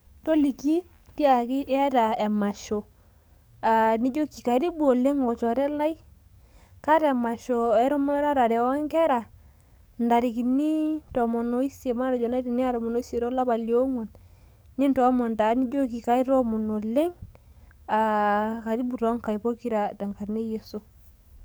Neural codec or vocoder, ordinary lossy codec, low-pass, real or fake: codec, 44.1 kHz, 7.8 kbps, DAC; none; none; fake